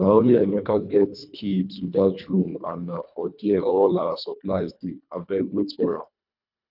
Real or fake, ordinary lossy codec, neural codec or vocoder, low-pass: fake; none; codec, 24 kHz, 1.5 kbps, HILCodec; 5.4 kHz